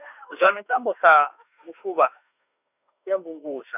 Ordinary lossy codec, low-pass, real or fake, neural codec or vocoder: none; 3.6 kHz; fake; autoencoder, 48 kHz, 32 numbers a frame, DAC-VAE, trained on Japanese speech